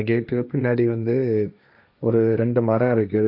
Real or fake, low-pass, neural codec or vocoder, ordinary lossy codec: fake; 5.4 kHz; codec, 16 kHz, 1.1 kbps, Voila-Tokenizer; AAC, 48 kbps